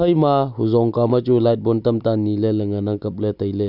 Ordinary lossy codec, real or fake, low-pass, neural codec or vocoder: none; real; 5.4 kHz; none